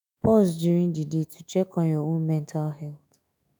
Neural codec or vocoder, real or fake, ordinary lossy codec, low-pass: autoencoder, 48 kHz, 128 numbers a frame, DAC-VAE, trained on Japanese speech; fake; none; none